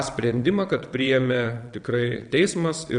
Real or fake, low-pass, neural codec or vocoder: fake; 9.9 kHz; vocoder, 22.05 kHz, 80 mel bands, WaveNeXt